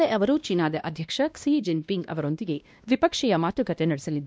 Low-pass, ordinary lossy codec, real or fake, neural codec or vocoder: none; none; fake; codec, 16 kHz, 1 kbps, X-Codec, WavLM features, trained on Multilingual LibriSpeech